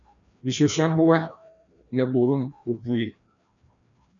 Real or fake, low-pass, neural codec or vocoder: fake; 7.2 kHz; codec, 16 kHz, 1 kbps, FreqCodec, larger model